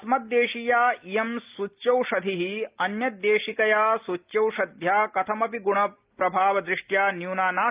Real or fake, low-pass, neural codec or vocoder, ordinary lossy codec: real; 3.6 kHz; none; Opus, 32 kbps